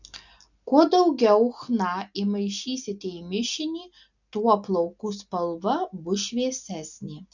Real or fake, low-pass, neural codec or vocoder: real; 7.2 kHz; none